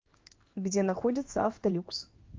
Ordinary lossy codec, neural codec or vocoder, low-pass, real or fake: Opus, 24 kbps; codec, 16 kHz in and 24 kHz out, 1 kbps, XY-Tokenizer; 7.2 kHz; fake